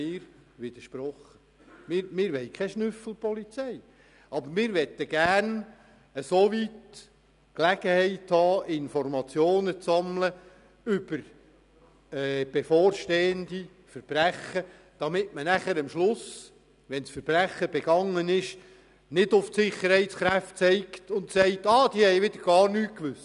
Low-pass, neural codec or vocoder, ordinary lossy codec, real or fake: 10.8 kHz; none; none; real